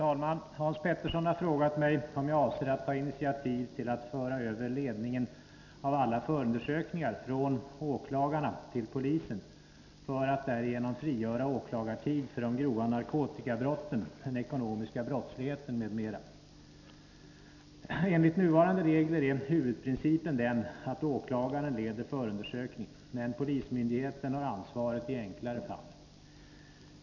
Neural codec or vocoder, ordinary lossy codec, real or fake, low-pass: none; none; real; 7.2 kHz